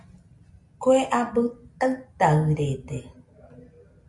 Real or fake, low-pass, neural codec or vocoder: real; 10.8 kHz; none